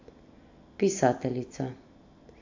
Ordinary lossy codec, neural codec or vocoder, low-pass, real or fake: AAC, 48 kbps; none; 7.2 kHz; real